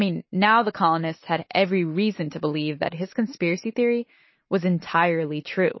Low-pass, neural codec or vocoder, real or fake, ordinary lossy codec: 7.2 kHz; none; real; MP3, 24 kbps